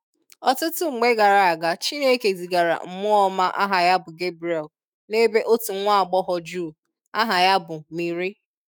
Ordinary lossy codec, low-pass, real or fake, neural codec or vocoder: none; none; fake; autoencoder, 48 kHz, 128 numbers a frame, DAC-VAE, trained on Japanese speech